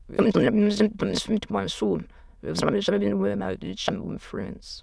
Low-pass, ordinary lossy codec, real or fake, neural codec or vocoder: none; none; fake; autoencoder, 22.05 kHz, a latent of 192 numbers a frame, VITS, trained on many speakers